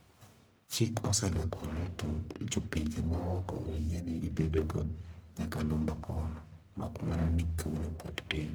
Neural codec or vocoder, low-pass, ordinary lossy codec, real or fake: codec, 44.1 kHz, 1.7 kbps, Pupu-Codec; none; none; fake